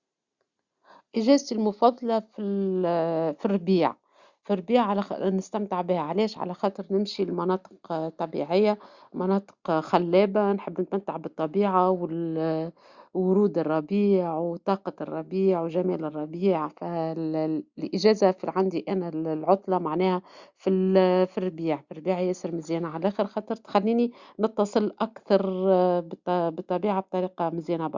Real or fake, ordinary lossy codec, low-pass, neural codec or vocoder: real; Opus, 64 kbps; 7.2 kHz; none